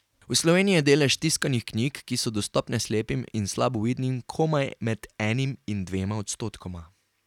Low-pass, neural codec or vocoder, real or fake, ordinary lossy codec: 19.8 kHz; none; real; none